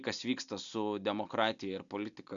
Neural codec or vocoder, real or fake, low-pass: none; real; 7.2 kHz